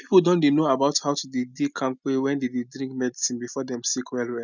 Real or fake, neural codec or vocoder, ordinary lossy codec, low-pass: real; none; none; 7.2 kHz